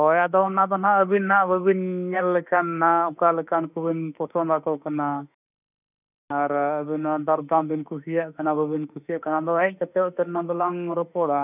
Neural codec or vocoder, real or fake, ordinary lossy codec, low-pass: autoencoder, 48 kHz, 32 numbers a frame, DAC-VAE, trained on Japanese speech; fake; none; 3.6 kHz